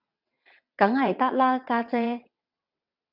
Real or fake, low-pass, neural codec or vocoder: fake; 5.4 kHz; vocoder, 22.05 kHz, 80 mel bands, WaveNeXt